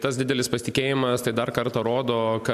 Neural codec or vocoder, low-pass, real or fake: none; 14.4 kHz; real